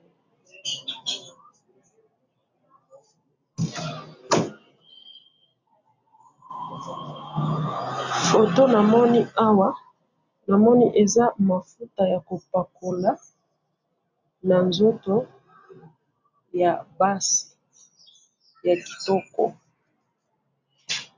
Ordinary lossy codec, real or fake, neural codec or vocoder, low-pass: MP3, 64 kbps; real; none; 7.2 kHz